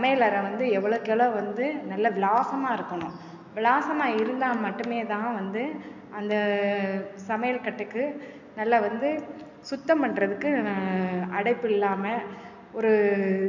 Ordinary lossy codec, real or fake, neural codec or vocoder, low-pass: none; real; none; 7.2 kHz